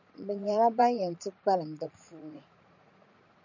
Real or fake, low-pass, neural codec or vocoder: fake; 7.2 kHz; vocoder, 44.1 kHz, 80 mel bands, Vocos